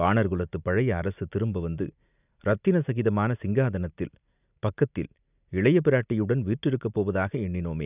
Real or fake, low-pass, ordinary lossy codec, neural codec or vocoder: real; 3.6 kHz; none; none